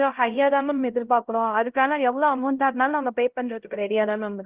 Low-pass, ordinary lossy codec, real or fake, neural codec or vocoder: 3.6 kHz; Opus, 16 kbps; fake; codec, 16 kHz, 0.5 kbps, X-Codec, HuBERT features, trained on LibriSpeech